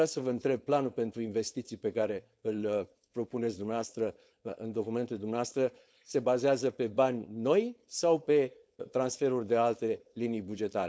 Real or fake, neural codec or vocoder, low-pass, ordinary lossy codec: fake; codec, 16 kHz, 4.8 kbps, FACodec; none; none